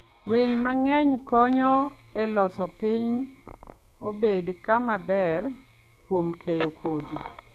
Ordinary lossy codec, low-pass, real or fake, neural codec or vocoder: none; 14.4 kHz; fake; codec, 44.1 kHz, 2.6 kbps, SNAC